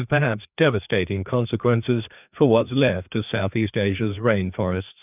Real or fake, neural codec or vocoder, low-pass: fake; codec, 16 kHz, 2 kbps, FreqCodec, larger model; 3.6 kHz